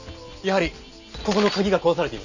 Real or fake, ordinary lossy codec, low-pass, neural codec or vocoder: real; none; 7.2 kHz; none